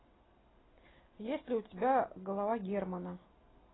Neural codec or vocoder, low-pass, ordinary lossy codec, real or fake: none; 7.2 kHz; AAC, 16 kbps; real